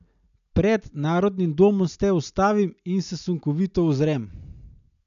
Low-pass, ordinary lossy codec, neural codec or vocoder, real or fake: 7.2 kHz; none; none; real